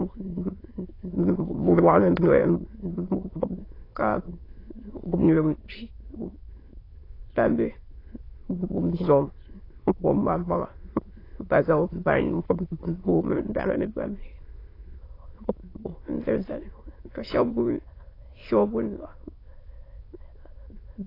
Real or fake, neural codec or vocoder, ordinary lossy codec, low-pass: fake; autoencoder, 22.05 kHz, a latent of 192 numbers a frame, VITS, trained on many speakers; AAC, 24 kbps; 5.4 kHz